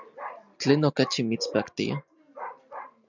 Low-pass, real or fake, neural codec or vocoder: 7.2 kHz; real; none